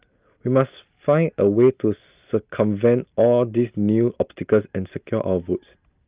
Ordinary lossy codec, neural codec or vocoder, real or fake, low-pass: Opus, 32 kbps; none; real; 3.6 kHz